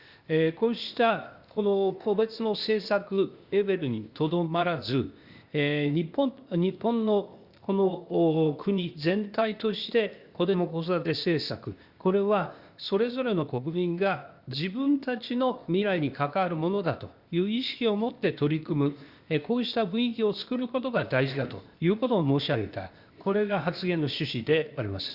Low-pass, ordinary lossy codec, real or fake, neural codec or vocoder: 5.4 kHz; Opus, 64 kbps; fake; codec, 16 kHz, 0.8 kbps, ZipCodec